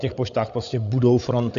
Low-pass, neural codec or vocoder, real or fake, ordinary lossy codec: 7.2 kHz; codec, 16 kHz, 8 kbps, FreqCodec, larger model; fake; AAC, 64 kbps